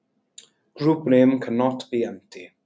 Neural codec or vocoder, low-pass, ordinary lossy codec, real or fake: none; none; none; real